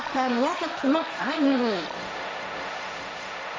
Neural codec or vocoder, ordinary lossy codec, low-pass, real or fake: codec, 16 kHz, 1.1 kbps, Voila-Tokenizer; none; none; fake